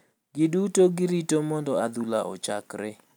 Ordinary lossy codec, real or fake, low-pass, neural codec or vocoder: none; real; none; none